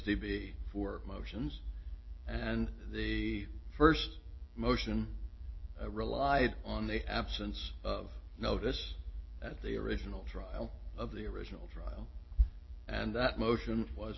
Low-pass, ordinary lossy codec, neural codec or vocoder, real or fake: 7.2 kHz; MP3, 24 kbps; none; real